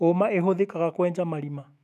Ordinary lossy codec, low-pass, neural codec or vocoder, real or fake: none; 14.4 kHz; autoencoder, 48 kHz, 128 numbers a frame, DAC-VAE, trained on Japanese speech; fake